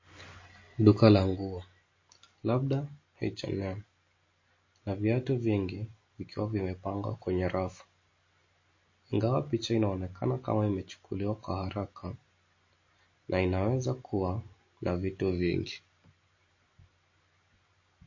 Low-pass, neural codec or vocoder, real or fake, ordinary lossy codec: 7.2 kHz; none; real; MP3, 32 kbps